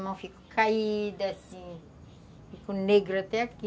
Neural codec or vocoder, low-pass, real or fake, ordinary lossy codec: none; none; real; none